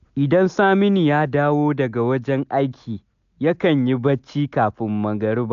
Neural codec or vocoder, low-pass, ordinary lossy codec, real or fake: none; 7.2 kHz; AAC, 96 kbps; real